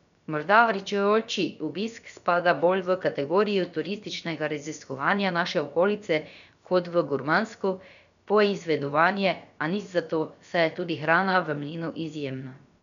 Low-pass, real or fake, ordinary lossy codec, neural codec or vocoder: 7.2 kHz; fake; none; codec, 16 kHz, about 1 kbps, DyCAST, with the encoder's durations